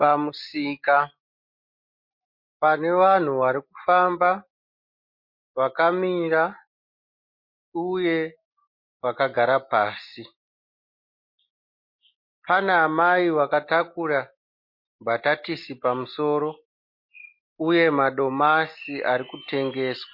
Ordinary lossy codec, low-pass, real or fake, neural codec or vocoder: MP3, 32 kbps; 5.4 kHz; real; none